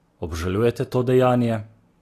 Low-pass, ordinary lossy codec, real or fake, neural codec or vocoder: 14.4 kHz; AAC, 48 kbps; real; none